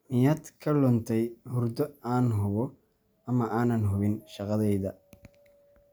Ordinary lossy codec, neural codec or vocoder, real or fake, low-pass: none; none; real; none